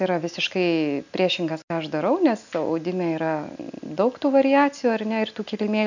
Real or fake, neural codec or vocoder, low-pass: real; none; 7.2 kHz